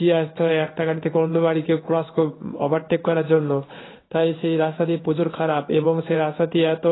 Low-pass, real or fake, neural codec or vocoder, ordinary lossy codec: 7.2 kHz; fake; codec, 16 kHz in and 24 kHz out, 1 kbps, XY-Tokenizer; AAC, 16 kbps